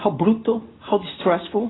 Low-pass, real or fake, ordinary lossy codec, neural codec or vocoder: 7.2 kHz; real; AAC, 16 kbps; none